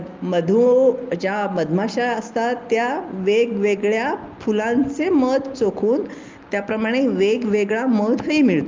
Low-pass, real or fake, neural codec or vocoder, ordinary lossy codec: 7.2 kHz; real; none; Opus, 32 kbps